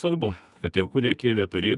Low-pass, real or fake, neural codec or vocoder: 10.8 kHz; fake; codec, 24 kHz, 0.9 kbps, WavTokenizer, medium music audio release